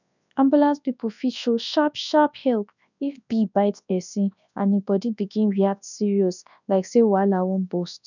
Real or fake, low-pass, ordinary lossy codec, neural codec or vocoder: fake; 7.2 kHz; none; codec, 24 kHz, 0.9 kbps, WavTokenizer, large speech release